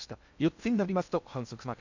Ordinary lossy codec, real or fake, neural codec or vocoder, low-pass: none; fake; codec, 16 kHz in and 24 kHz out, 0.6 kbps, FocalCodec, streaming, 2048 codes; 7.2 kHz